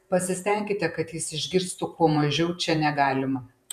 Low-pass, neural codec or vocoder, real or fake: 14.4 kHz; vocoder, 48 kHz, 128 mel bands, Vocos; fake